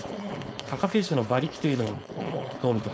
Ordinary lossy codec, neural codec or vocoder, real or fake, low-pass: none; codec, 16 kHz, 4.8 kbps, FACodec; fake; none